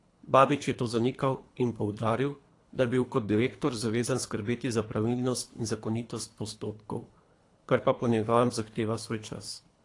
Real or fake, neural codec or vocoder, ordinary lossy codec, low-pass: fake; codec, 24 kHz, 3 kbps, HILCodec; AAC, 48 kbps; 10.8 kHz